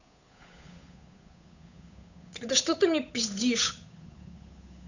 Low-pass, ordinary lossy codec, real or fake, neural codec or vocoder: 7.2 kHz; none; fake; codec, 16 kHz, 8 kbps, FunCodec, trained on Chinese and English, 25 frames a second